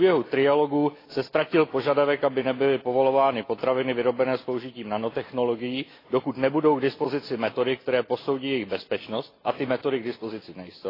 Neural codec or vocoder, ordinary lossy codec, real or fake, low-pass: none; AAC, 24 kbps; real; 5.4 kHz